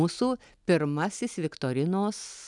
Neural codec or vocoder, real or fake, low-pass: none; real; 10.8 kHz